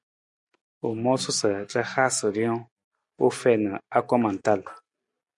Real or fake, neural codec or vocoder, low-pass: real; none; 10.8 kHz